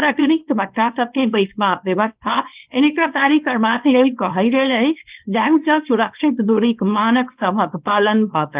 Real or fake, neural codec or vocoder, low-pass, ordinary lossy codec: fake; codec, 24 kHz, 0.9 kbps, WavTokenizer, small release; 3.6 kHz; Opus, 32 kbps